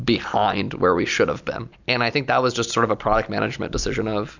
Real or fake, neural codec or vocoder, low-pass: real; none; 7.2 kHz